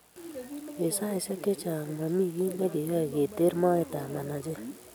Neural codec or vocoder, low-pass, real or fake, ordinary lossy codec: none; none; real; none